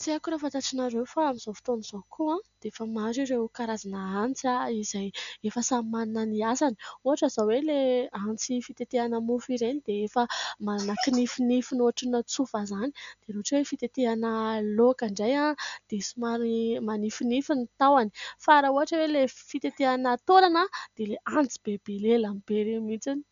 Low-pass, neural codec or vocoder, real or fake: 7.2 kHz; none; real